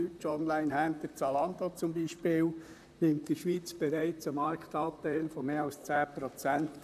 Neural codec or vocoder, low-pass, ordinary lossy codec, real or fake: vocoder, 44.1 kHz, 128 mel bands, Pupu-Vocoder; 14.4 kHz; none; fake